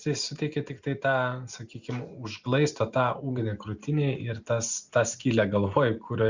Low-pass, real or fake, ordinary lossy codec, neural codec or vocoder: 7.2 kHz; real; Opus, 64 kbps; none